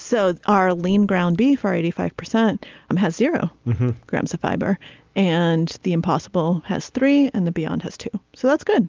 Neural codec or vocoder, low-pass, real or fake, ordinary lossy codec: none; 7.2 kHz; real; Opus, 24 kbps